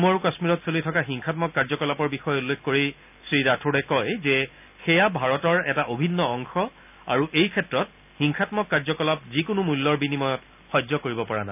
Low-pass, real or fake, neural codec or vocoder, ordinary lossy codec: 3.6 kHz; real; none; none